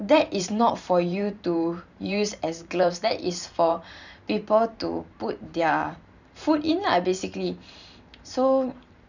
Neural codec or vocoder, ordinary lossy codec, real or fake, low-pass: vocoder, 44.1 kHz, 128 mel bands every 512 samples, BigVGAN v2; none; fake; 7.2 kHz